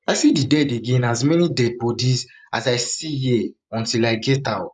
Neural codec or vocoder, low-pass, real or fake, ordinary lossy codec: vocoder, 24 kHz, 100 mel bands, Vocos; 10.8 kHz; fake; none